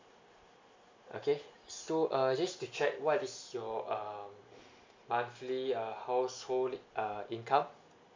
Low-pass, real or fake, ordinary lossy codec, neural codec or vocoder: 7.2 kHz; real; MP3, 64 kbps; none